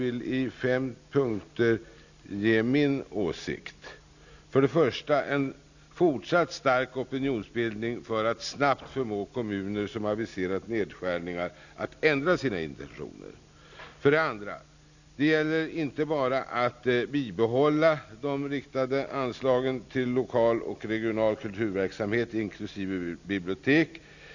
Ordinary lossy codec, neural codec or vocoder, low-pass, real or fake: none; none; 7.2 kHz; real